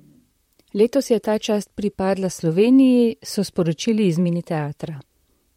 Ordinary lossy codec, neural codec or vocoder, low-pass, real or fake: MP3, 64 kbps; vocoder, 44.1 kHz, 128 mel bands, Pupu-Vocoder; 19.8 kHz; fake